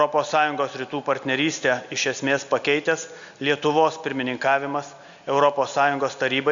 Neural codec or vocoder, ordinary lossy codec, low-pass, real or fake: none; Opus, 64 kbps; 7.2 kHz; real